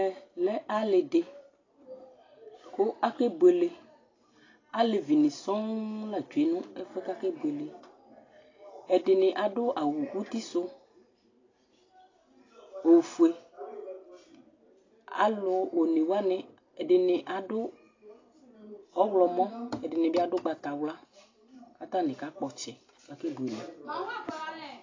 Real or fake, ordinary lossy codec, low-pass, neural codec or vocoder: real; AAC, 32 kbps; 7.2 kHz; none